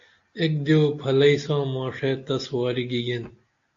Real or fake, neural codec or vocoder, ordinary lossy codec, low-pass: real; none; AAC, 48 kbps; 7.2 kHz